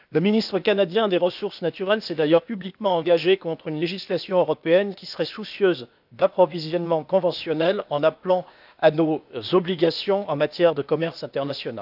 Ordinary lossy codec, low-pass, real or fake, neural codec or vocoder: none; 5.4 kHz; fake; codec, 16 kHz, 0.8 kbps, ZipCodec